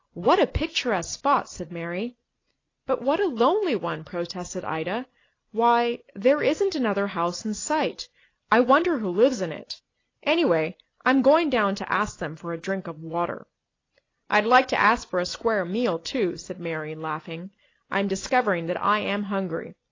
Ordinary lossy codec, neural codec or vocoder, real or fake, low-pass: AAC, 32 kbps; none; real; 7.2 kHz